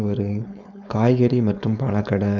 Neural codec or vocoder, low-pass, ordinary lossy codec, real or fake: codec, 16 kHz, 4.8 kbps, FACodec; 7.2 kHz; none; fake